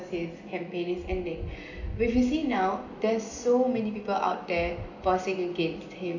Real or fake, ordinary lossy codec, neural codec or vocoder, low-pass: real; none; none; 7.2 kHz